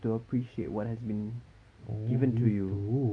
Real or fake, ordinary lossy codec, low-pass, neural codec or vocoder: real; AAC, 48 kbps; 9.9 kHz; none